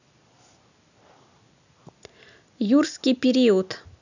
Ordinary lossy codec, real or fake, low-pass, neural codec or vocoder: none; real; 7.2 kHz; none